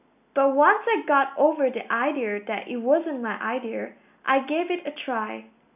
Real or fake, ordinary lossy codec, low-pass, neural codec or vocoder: real; none; 3.6 kHz; none